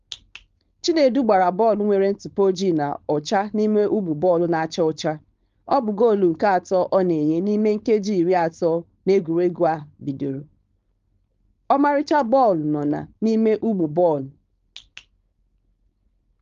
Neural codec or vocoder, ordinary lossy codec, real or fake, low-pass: codec, 16 kHz, 4.8 kbps, FACodec; Opus, 32 kbps; fake; 7.2 kHz